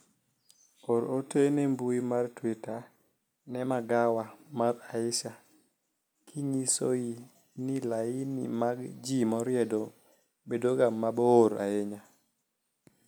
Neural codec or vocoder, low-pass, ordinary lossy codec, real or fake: none; none; none; real